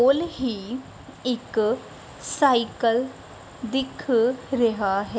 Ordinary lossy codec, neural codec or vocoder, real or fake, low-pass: none; none; real; none